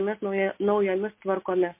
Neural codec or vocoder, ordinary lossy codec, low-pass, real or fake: none; MP3, 24 kbps; 3.6 kHz; real